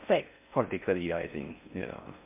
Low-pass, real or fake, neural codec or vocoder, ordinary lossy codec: 3.6 kHz; fake; codec, 16 kHz in and 24 kHz out, 0.6 kbps, FocalCodec, streaming, 4096 codes; MP3, 32 kbps